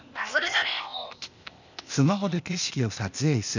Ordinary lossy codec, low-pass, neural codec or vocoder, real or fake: none; 7.2 kHz; codec, 16 kHz, 0.8 kbps, ZipCodec; fake